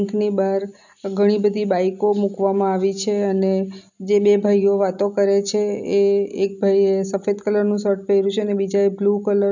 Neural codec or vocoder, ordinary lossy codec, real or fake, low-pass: none; none; real; 7.2 kHz